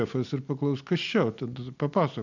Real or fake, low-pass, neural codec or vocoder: real; 7.2 kHz; none